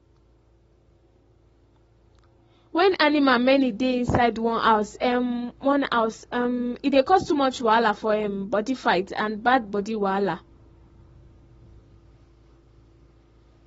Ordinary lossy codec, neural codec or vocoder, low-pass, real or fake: AAC, 24 kbps; codec, 44.1 kHz, 7.8 kbps, Pupu-Codec; 19.8 kHz; fake